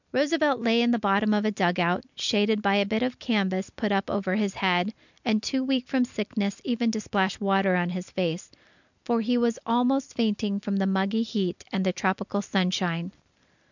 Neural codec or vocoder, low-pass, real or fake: none; 7.2 kHz; real